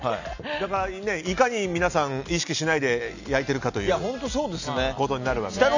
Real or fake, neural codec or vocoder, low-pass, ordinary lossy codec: real; none; 7.2 kHz; none